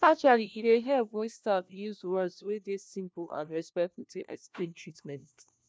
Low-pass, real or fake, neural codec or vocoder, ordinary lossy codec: none; fake; codec, 16 kHz, 1 kbps, FunCodec, trained on LibriTTS, 50 frames a second; none